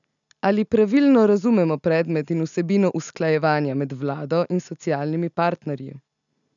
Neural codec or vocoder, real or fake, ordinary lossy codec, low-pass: none; real; none; 7.2 kHz